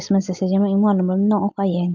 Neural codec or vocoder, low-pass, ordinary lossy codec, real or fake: none; 7.2 kHz; Opus, 24 kbps; real